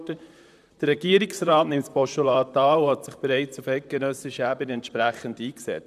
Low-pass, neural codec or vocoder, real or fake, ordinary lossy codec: 14.4 kHz; vocoder, 44.1 kHz, 128 mel bands, Pupu-Vocoder; fake; none